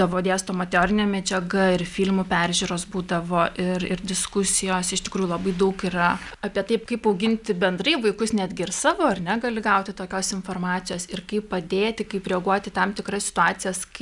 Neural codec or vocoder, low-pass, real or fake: none; 10.8 kHz; real